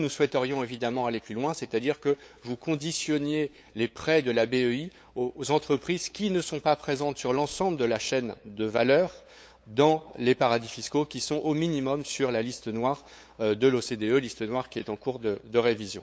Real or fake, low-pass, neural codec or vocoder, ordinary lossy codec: fake; none; codec, 16 kHz, 8 kbps, FunCodec, trained on LibriTTS, 25 frames a second; none